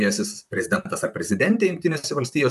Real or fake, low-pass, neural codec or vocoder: fake; 14.4 kHz; vocoder, 44.1 kHz, 128 mel bands, Pupu-Vocoder